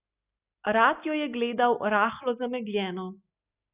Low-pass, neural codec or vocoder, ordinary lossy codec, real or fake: 3.6 kHz; none; Opus, 64 kbps; real